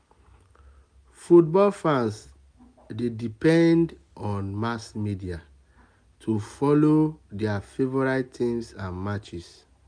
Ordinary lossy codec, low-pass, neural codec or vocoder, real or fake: none; 9.9 kHz; none; real